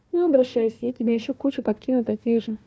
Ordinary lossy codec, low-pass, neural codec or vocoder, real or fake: none; none; codec, 16 kHz, 1 kbps, FunCodec, trained on Chinese and English, 50 frames a second; fake